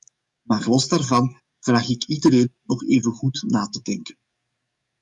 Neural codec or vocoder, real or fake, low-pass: codec, 44.1 kHz, 7.8 kbps, DAC; fake; 10.8 kHz